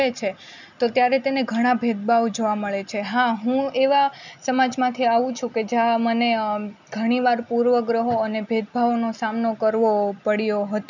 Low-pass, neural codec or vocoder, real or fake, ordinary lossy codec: 7.2 kHz; none; real; none